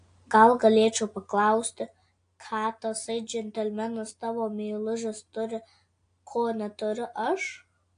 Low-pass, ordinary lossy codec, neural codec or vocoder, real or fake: 9.9 kHz; AAC, 48 kbps; none; real